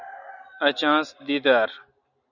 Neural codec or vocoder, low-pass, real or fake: none; 7.2 kHz; real